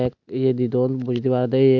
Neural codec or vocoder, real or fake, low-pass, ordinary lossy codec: none; real; 7.2 kHz; none